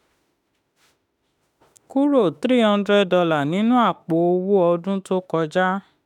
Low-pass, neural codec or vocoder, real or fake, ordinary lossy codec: 19.8 kHz; autoencoder, 48 kHz, 32 numbers a frame, DAC-VAE, trained on Japanese speech; fake; none